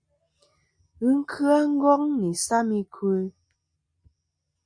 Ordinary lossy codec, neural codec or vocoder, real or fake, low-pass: MP3, 64 kbps; none; real; 9.9 kHz